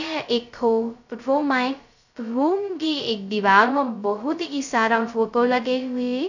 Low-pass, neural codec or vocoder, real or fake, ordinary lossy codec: 7.2 kHz; codec, 16 kHz, 0.2 kbps, FocalCodec; fake; none